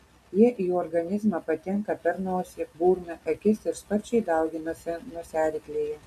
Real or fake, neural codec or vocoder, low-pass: real; none; 14.4 kHz